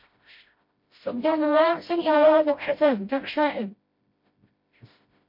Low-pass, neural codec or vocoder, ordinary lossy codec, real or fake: 5.4 kHz; codec, 16 kHz, 0.5 kbps, FreqCodec, smaller model; MP3, 32 kbps; fake